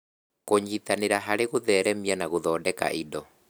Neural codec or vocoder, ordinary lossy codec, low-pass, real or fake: none; none; none; real